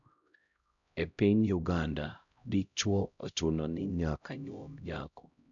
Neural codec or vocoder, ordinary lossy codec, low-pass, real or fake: codec, 16 kHz, 0.5 kbps, X-Codec, HuBERT features, trained on LibriSpeech; none; 7.2 kHz; fake